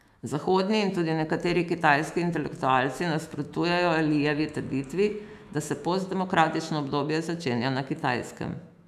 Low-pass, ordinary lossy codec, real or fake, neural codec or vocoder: 14.4 kHz; none; fake; autoencoder, 48 kHz, 128 numbers a frame, DAC-VAE, trained on Japanese speech